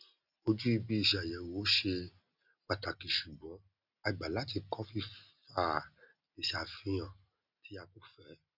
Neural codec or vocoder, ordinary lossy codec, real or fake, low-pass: none; none; real; 5.4 kHz